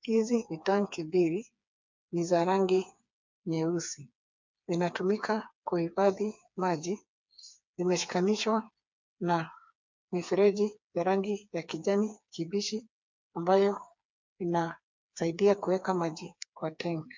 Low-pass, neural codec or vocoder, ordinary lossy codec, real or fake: 7.2 kHz; codec, 16 kHz, 4 kbps, FreqCodec, smaller model; MP3, 64 kbps; fake